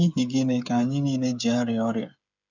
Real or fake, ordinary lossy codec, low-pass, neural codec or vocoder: fake; none; 7.2 kHz; codec, 16 kHz, 8 kbps, FreqCodec, smaller model